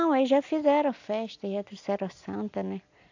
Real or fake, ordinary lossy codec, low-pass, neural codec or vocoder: real; AAC, 48 kbps; 7.2 kHz; none